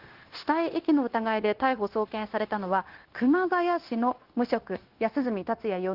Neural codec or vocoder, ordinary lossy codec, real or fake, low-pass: codec, 16 kHz, 0.9 kbps, LongCat-Audio-Codec; Opus, 16 kbps; fake; 5.4 kHz